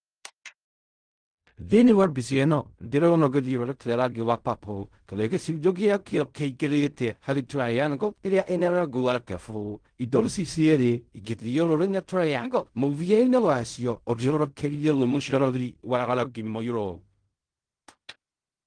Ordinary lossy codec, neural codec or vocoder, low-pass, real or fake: Opus, 24 kbps; codec, 16 kHz in and 24 kHz out, 0.4 kbps, LongCat-Audio-Codec, fine tuned four codebook decoder; 9.9 kHz; fake